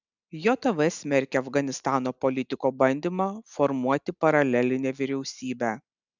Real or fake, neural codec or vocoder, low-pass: real; none; 7.2 kHz